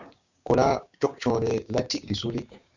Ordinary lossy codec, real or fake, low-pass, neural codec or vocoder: Opus, 64 kbps; fake; 7.2 kHz; codec, 16 kHz, 6 kbps, DAC